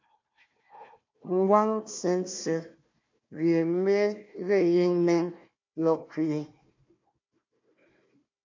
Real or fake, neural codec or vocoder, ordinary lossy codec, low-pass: fake; codec, 16 kHz, 1 kbps, FunCodec, trained on Chinese and English, 50 frames a second; MP3, 48 kbps; 7.2 kHz